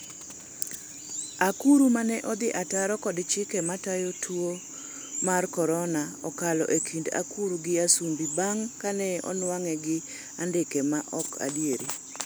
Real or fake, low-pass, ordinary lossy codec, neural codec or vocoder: real; none; none; none